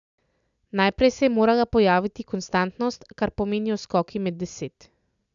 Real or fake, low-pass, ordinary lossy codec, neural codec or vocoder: real; 7.2 kHz; none; none